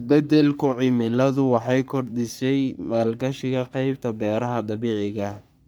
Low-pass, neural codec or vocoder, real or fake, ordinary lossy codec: none; codec, 44.1 kHz, 3.4 kbps, Pupu-Codec; fake; none